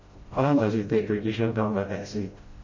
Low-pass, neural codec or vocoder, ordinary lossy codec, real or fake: 7.2 kHz; codec, 16 kHz, 0.5 kbps, FreqCodec, smaller model; MP3, 32 kbps; fake